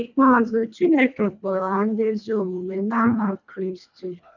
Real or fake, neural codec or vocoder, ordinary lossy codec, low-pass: fake; codec, 24 kHz, 1.5 kbps, HILCodec; none; 7.2 kHz